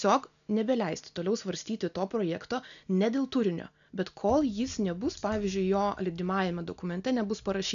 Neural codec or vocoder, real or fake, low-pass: none; real; 7.2 kHz